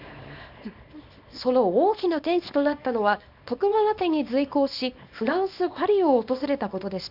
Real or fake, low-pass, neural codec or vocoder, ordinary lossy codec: fake; 5.4 kHz; codec, 24 kHz, 0.9 kbps, WavTokenizer, small release; none